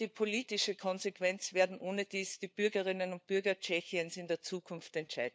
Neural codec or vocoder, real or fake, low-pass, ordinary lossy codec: codec, 16 kHz, 4 kbps, FunCodec, trained on LibriTTS, 50 frames a second; fake; none; none